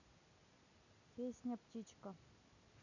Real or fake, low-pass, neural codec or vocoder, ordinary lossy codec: real; 7.2 kHz; none; none